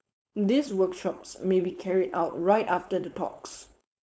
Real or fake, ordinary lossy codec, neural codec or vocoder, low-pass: fake; none; codec, 16 kHz, 4.8 kbps, FACodec; none